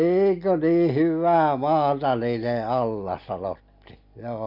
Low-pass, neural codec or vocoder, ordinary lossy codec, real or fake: 5.4 kHz; none; none; real